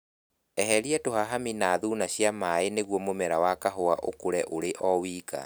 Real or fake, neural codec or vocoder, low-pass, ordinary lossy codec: real; none; none; none